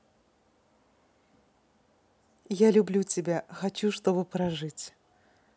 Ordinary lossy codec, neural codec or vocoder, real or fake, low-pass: none; none; real; none